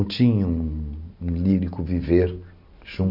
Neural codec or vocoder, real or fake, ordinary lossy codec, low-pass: none; real; none; 5.4 kHz